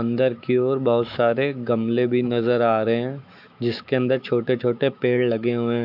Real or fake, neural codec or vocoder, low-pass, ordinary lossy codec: fake; autoencoder, 48 kHz, 128 numbers a frame, DAC-VAE, trained on Japanese speech; 5.4 kHz; AAC, 48 kbps